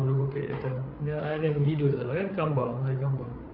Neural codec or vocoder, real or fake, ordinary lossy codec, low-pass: codec, 16 kHz, 8 kbps, FreqCodec, larger model; fake; AAC, 32 kbps; 5.4 kHz